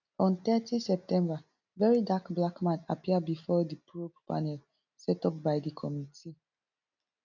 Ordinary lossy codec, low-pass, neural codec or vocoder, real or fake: none; 7.2 kHz; none; real